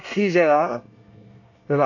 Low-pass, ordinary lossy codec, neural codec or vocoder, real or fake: 7.2 kHz; none; codec, 24 kHz, 1 kbps, SNAC; fake